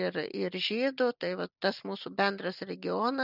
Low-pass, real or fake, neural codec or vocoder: 5.4 kHz; real; none